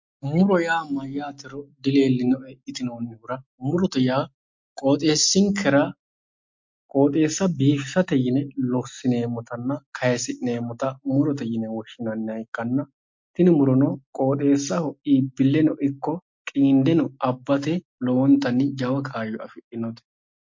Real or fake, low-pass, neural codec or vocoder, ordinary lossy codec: real; 7.2 kHz; none; MP3, 48 kbps